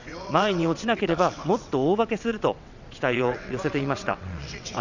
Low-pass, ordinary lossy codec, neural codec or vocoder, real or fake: 7.2 kHz; none; vocoder, 22.05 kHz, 80 mel bands, WaveNeXt; fake